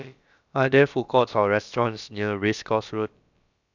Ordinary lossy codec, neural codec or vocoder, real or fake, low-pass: none; codec, 16 kHz, about 1 kbps, DyCAST, with the encoder's durations; fake; 7.2 kHz